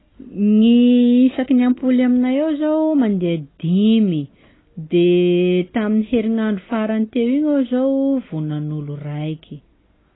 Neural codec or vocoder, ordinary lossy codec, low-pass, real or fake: none; AAC, 16 kbps; 7.2 kHz; real